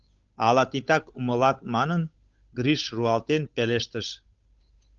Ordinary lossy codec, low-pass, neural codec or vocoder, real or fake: Opus, 24 kbps; 7.2 kHz; codec, 16 kHz, 8 kbps, FunCodec, trained on Chinese and English, 25 frames a second; fake